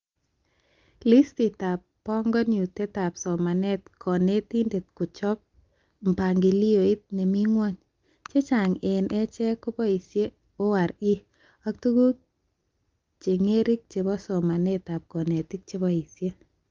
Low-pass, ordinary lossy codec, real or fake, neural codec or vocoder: 7.2 kHz; Opus, 32 kbps; real; none